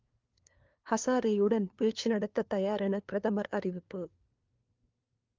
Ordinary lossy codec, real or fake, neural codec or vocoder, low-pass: Opus, 24 kbps; fake; codec, 16 kHz, 2 kbps, FunCodec, trained on LibriTTS, 25 frames a second; 7.2 kHz